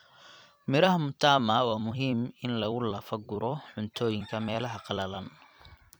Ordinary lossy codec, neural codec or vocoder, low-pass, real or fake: none; vocoder, 44.1 kHz, 128 mel bands every 256 samples, BigVGAN v2; none; fake